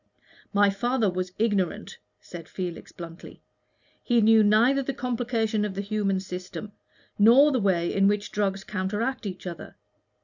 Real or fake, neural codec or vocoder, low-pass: real; none; 7.2 kHz